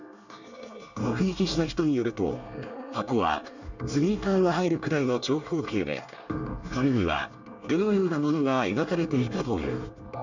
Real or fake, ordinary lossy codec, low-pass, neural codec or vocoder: fake; none; 7.2 kHz; codec, 24 kHz, 1 kbps, SNAC